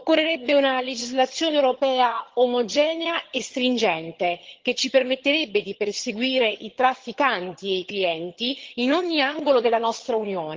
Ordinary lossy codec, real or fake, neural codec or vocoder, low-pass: Opus, 16 kbps; fake; vocoder, 22.05 kHz, 80 mel bands, HiFi-GAN; 7.2 kHz